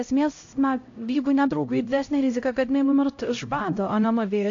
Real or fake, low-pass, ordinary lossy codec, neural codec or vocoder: fake; 7.2 kHz; AAC, 48 kbps; codec, 16 kHz, 0.5 kbps, X-Codec, HuBERT features, trained on LibriSpeech